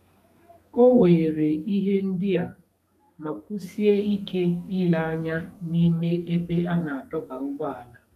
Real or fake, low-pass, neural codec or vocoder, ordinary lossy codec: fake; 14.4 kHz; codec, 32 kHz, 1.9 kbps, SNAC; none